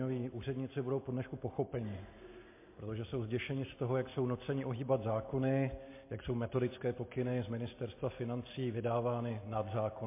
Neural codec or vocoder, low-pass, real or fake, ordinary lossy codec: none; 3.6 kHz; real; MP3, 24 kbps